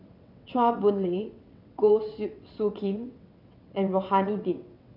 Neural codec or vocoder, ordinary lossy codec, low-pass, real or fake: vocoder, 22.05 kHz, 80 mel bands, WaveNeXt; none; 5.4 kHz; fake